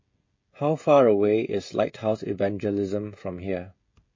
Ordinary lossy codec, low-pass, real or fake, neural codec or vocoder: MP3, 32 kbps; 7.2 kHz; fake; codec, 16 kHz, 16 kbps, FreqCodec, smaller model